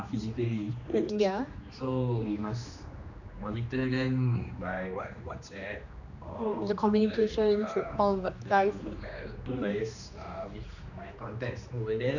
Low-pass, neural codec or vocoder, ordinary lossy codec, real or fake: 7.2 kHz; codec, 16 kHz, 2 kbps, X-Codec, HuBERT features, trained on general audio; none; fake